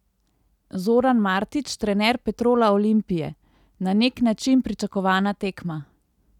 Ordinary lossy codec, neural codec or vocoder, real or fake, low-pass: none; none; real; 19.8 kHz